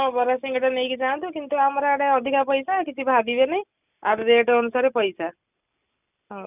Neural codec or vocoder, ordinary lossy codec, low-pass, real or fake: none; none; 3.6 kHz; real